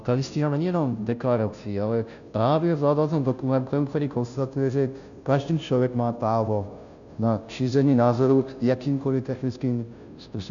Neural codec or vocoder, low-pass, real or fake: codec, 16 kHz, 0.5 kbps, FunCodec, trained on Chinese and English, 25 frames a second; 7.2 kHz; fake